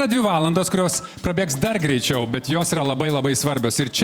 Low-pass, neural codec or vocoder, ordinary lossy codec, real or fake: 19.8 kHz; vocoder, 48 kHz, 128 mel bands, Vocos; Opus, 64 kbps; fake